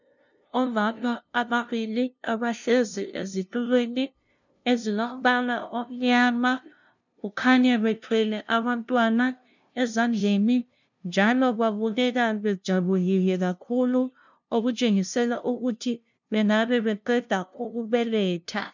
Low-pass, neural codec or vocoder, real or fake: 7.2 kHz; codec, 16 kHz, 0.5 kbps, FunCodec, trained on LibriTTS, 25 frames a second; fake